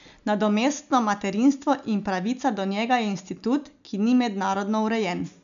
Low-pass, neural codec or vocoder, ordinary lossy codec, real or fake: 7.2 kHz; none; none; real